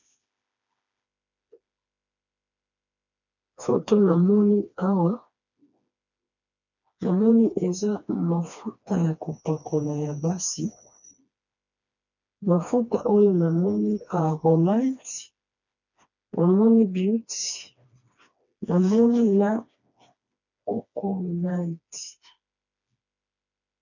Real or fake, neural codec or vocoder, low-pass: fake; codec, 16 kHz, 2 kbps, FreqCodec, smaller model; 7.2 kHz